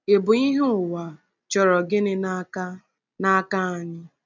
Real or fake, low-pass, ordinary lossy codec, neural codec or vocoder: real; 7.2 kHz; none; none